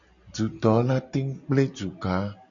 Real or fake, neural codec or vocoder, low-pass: real; none; 7.2 kHz